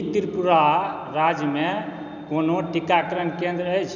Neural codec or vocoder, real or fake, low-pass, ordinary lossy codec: none; real; 7.2 kHz; none